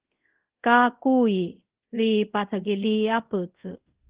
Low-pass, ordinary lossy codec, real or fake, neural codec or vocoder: 3.6 kHz; Opus, 16 kbps; fake; codec, 24 kHz, 0.5 kbps, DualCodec